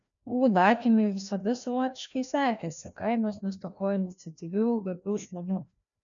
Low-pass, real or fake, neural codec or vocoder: 7.2 kHz; fake; codec, 16 kHz, 1 kbps, FreqCodec, larger model